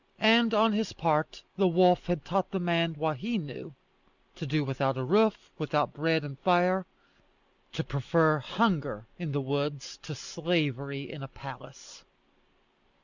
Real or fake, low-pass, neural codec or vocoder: real; 7.2 kHz; none